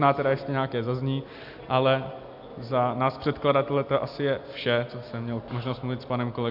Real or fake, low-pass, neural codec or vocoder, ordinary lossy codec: real; 5.4 kHz; none; MP3, 48 kbps